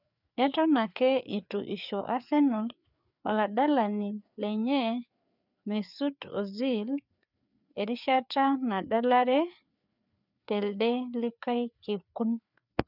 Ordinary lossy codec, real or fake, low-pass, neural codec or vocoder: none; fake; 5.4 kHz; codec, 16 kHz, 4 kbps, FreqCodec, larger model